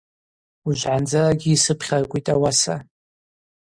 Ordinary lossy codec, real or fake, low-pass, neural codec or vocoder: Opus, 64 kbps; real; 9.9 kHz; none